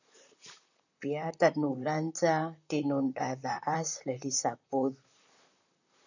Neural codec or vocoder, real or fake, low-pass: vocoder, 44.1 kHz, 128 mel bands, Pupu-Vocoder; fake; 7.2 kHz